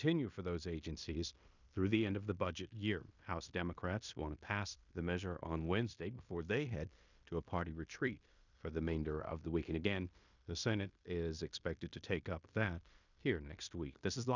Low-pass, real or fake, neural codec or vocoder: 7.2 kHz; fake; codec, 16 kHz in and 24 kHz out, 0.9 kbps, LongCat-Audio-Codec, fine tuned four codebook decoder